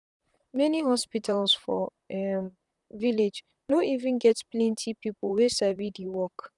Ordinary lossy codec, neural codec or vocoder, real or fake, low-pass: none; vocoder, 44.1 kHz, 128 mel bands, Pupu-Vocoder; fake; 10.8 kHz